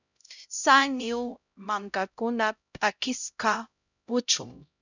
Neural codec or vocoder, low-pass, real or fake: codec, 16 kHz, 0.5 kbps, X-Codec, HuBERT features, trained on LibriSpeech; 7.2 kHz; fake